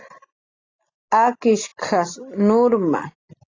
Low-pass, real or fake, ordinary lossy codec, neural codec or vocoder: 7.2 kHz; real; AAC, 32 kbps; none